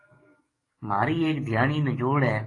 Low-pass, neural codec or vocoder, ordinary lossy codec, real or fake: 10.8 kHz; codec, 44.1 kHz, 7.8 kbps, DAC; AAC, 32 kbps; fake